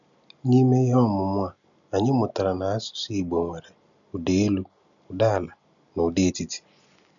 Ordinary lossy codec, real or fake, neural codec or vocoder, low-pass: none; real; none; 7.2 kHz